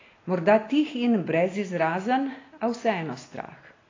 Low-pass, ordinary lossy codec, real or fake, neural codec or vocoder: 7.2 kHz; AAC, 32 kbps; real; none